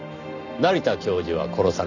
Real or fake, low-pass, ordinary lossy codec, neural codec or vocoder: real; 7.2 kHz; none; none